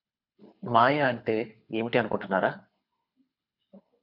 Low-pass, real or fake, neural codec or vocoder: 5.4 kHz; fake; codec, 24 kHz, 6 kbps, HILCodec